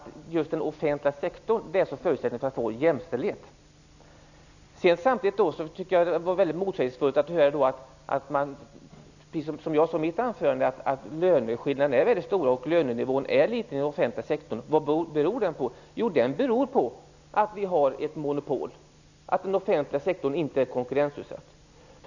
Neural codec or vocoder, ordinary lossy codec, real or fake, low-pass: none; none; real; 7.2 kHz